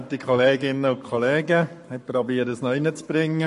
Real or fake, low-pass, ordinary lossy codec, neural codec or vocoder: fake; 14.4 kHz; MP3, 48 kbps; codec, 44.1 kHz, 7.8 kbps, Pupu-Codec